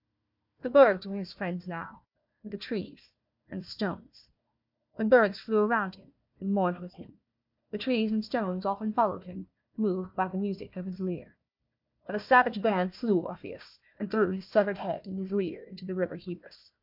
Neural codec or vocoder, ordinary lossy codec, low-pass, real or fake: codec, 16 kHz, 1 kbps, FunCodec, trained on Chinese and English, 50 frames a second; MP3, 48 kbps; 5.4 kHz; fake